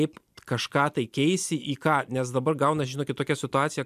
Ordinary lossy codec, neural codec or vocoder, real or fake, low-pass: MP3, 96 kbps; none; real; 14.4 kHz